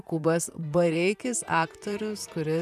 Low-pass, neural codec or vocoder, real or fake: 14.4 kHz; vocoder, 44.1 kHz, 128 mel bands, Pupu-Vocoder; fake